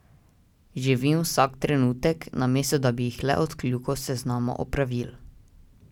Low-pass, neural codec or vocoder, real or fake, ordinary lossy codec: 19.8 kHz; none; real; none